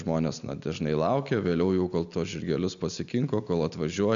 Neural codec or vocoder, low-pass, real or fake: none; 7.2 kHz; real